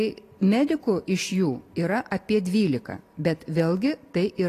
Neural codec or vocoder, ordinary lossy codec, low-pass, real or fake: none; AAC, 48 kbps; 14.4 kHz; real